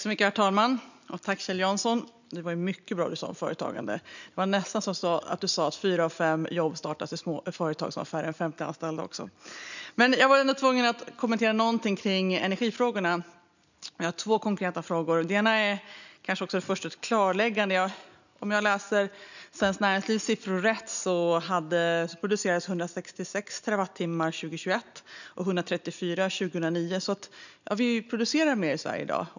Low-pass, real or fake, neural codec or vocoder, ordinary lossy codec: 7.2 kHz; real; none; none